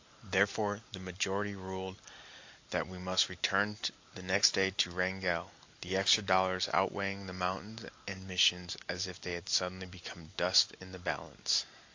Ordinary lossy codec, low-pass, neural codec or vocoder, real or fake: AAC, 48 kbps; 7.2 kHz; none; real